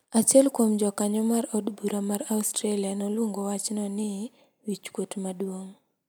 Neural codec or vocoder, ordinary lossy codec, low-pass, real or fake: none; none; none; real